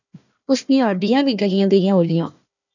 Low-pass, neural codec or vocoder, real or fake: 7.2 kHz; codec, 16 kHz, 1 kbps, FunCodec, trained on Chinese and English, 50 frames a second; fake